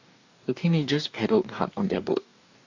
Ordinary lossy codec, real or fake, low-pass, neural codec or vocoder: AAC, 48 kbps; fake; 7.2 kHz; codec, 44.1 kHz, 2.6 kbps, DAC